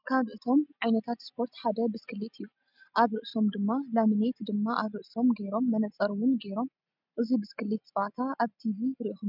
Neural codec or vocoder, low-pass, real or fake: none; 5.4 kHz; real